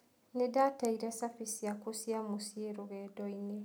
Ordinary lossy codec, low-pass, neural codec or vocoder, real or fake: none; none; none; real